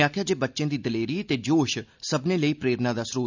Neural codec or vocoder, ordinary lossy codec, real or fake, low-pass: none; none; real; 7.2 kHz